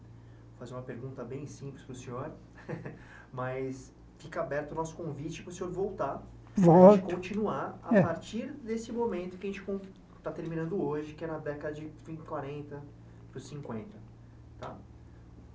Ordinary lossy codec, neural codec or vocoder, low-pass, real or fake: none; none; none; real